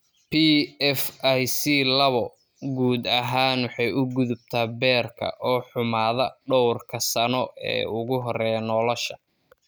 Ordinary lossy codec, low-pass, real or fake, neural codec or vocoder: none; none; real; none